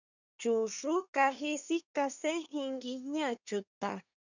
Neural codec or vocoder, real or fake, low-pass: codec, 24 kHz, 1 kbps, SNAC; fake; 7.2 kHz